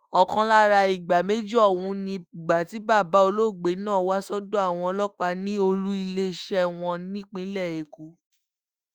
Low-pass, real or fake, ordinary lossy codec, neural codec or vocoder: 19.8 kHz; fake; Opus, 64 kbps; autoencoder, 48 kHz, 32 numbers a frame, DAC-VAE, trained on Japanese speech